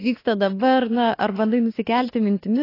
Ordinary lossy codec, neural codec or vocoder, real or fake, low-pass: AAC, 24 kbps; autoencoder, 48 kHz, 32 numbers a frame, DAC-VAE, trained on Japanese speech; fake; 5.4 kHz